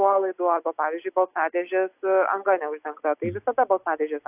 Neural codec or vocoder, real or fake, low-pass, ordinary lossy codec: none; real; 3.6 kHz; AAC, 32 kbps